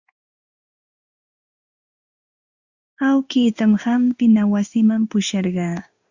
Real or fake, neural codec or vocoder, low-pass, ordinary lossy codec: fake; codec, 16 kHz in and 24 kHz out, 1 kbps, XY-Tokenizer; 7.2 kHz; Opus, 64 kbps